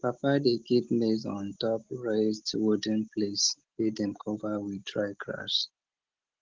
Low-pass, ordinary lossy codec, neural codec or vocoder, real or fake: 7.2 kHz; Opus, 16 kbps; none; real